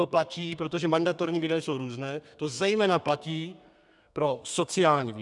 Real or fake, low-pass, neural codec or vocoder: fake; 10.8 kHz; codec, 32 kHz, 1.9 kbps, SNAC